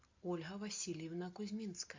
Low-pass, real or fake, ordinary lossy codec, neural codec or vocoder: 7.2 kHz; real; MP3, 64 kbps; none